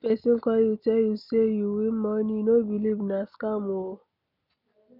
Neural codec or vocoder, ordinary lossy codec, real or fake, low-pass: none; none; real; 5.4 kHz